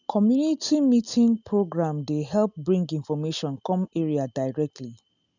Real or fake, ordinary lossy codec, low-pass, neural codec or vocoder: real; none; 7.2 kHz; none